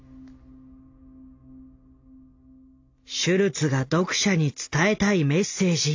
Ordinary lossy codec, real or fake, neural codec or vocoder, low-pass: AAC, 48 kbps; real; none; 7.2 kHz